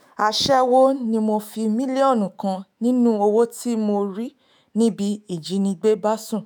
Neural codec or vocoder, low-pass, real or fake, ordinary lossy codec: autoencoder, 48 kHz, 128 numbers a frame, DAC-VAE, trained on Japanese speech; none; fake; none